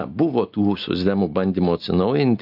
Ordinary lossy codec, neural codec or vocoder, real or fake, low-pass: AAC, 48 kbps; none; real; 5.4 kHz